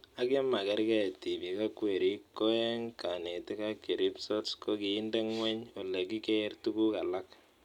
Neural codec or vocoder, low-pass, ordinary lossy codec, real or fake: none; 19.8 kHz; none; real